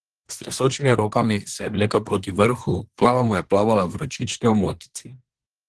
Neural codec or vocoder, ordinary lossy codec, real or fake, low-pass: codec, 24 kHz, 1 kbps, SNAC; Opus, 16 kbps; fake; 10.8 kHz